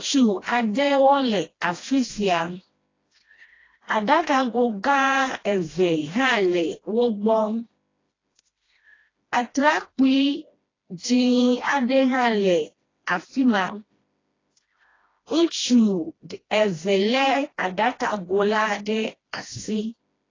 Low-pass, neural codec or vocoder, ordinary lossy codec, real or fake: 7.2 kHz; codec, 16 kHz, 1 kbps, FreqCodec, smaller model; AAC, 32 kbps; fake